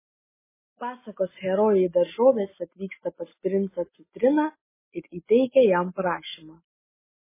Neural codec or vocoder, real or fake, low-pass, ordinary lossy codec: none; real; 3.6 kHz; MP3, 16 kbps